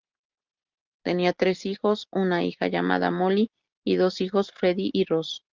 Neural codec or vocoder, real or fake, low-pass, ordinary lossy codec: none; real; 7.2 kHz; Opus, 24 kbps